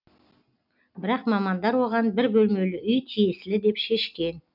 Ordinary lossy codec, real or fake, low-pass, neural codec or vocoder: none; real; 5.4 kHz; none